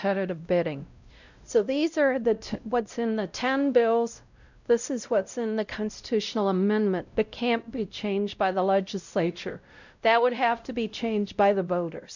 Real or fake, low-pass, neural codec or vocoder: fake; 7.2 kHz; codec, 16 kHz, 0.5 kbps, X-Codec, WavLM features, trained on Multilingual LibriSpeech